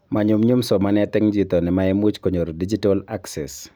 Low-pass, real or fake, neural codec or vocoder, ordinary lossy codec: none; real; none; none